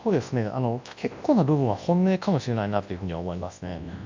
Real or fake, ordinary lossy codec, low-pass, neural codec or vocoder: fake; MP3, 64 kbps; 7.2 kHz; codec, 24 kHz, 0.9 kbps, WavTokenizer, large speech release